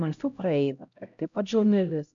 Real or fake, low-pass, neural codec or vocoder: fake; 7.2 kHz; codec, 16 kHz, 0.5 kbps, X-Codec, HuBERT features, trained on LibriSpeech